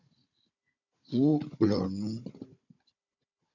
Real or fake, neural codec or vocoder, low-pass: fake; codec, 16 kHz, 16 kbps, FunCodec, trained on Chinese and English, 50 frames a second; 7.2 kHz